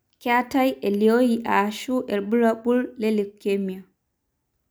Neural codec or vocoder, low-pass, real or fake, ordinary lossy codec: none; none; real; none